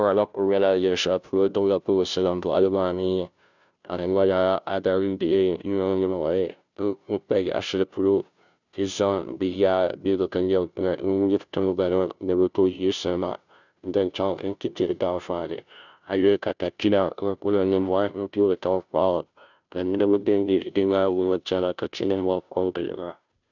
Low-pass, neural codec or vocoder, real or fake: 7.2 kHz; codec, 16 kHz, 0.5 kbps, FunCodec, trained on Chinese and English, 25 frames a second; fake